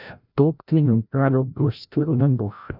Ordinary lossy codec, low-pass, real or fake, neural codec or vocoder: none; 5.4 kHz; fake; codec, 16 kHz, 0.5 kbps, FreqCodec, larger model